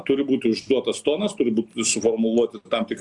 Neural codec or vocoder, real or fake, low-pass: none; real; 10.8 kHz